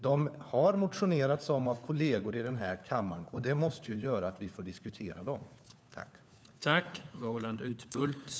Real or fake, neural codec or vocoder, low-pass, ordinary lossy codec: fake; codec, 16 kHz, 4 kbps, FunCodec, trained on LibriTTS, 50 frames a second; none; none